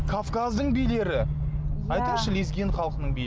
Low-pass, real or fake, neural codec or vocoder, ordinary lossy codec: none; real; none; none